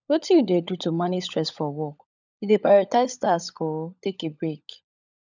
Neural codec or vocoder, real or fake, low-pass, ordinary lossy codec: codec, 16 kHz, 16 kbps, FunCodec, trained on LibriTTS, 50 frames a second; fake; 7.2 kHz; none